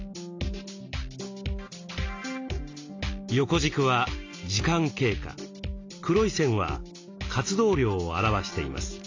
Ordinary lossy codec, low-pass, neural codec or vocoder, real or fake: AAC, 48 kbps; 7.2 kHz; none; real